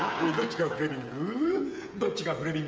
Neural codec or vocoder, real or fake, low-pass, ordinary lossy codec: codec, 16 kHz, 16 kbps, FreqCodec, smaller model; fake; none; none